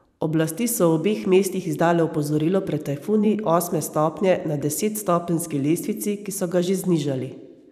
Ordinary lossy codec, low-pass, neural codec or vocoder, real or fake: none; 14.4 kHz; vocoder, 44.1 kHz, 128 mel bands every 512 samples, BigVGAN v2; fake